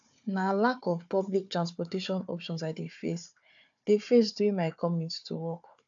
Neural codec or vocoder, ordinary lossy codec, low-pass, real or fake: codec, 16 kHz, 4 kbps, FunCodec, trained on Chinese and English, 50 frames a second; AAC, 64 kbps; 7.2 kHz; fake